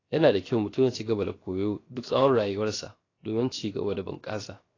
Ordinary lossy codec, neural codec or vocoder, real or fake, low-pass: AAC, 32 kbps; codec, 16 kHz, 0.7 kbps, FocalCodec; fake; 7.2 kHz